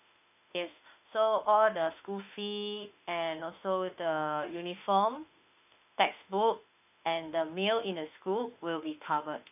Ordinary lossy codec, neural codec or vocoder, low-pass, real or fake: none; autoencoder, 48 kHz, 32 numbers a frame, DAC-VAE, trained on Japanese speech; 3.6 kHz; fake